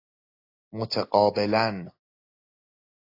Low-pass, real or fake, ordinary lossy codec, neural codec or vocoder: 5.4 kHz; real; AAC, 32 kbps; none